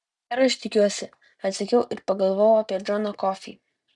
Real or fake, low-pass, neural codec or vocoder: real; 10.8 kHz; none